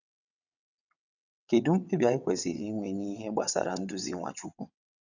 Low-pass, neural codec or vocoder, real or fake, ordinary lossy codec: 7.2 kHz; vocoder, 22.05 kHz, 80 mel bands, WaveNeXt; fake; none